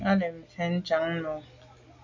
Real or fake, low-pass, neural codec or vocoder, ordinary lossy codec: real; 7.2 kHz; none; MP3, 64 kbps